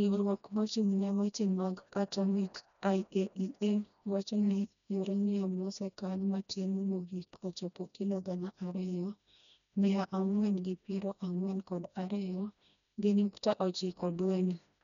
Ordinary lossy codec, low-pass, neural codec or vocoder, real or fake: none; 7.2 kHz; codec, 16 kHz, 1 kbps, FreqCodec, smaller model; fake